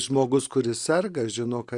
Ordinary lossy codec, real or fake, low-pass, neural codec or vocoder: Opus, 64 kbps; real; 10.8 kHz; none